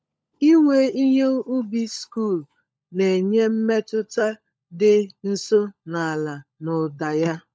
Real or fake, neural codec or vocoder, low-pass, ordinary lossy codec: fake; codec, 16 kHz, 16 kbps, FunCodec, trained on LibriTTS, 50 frames a second; none; none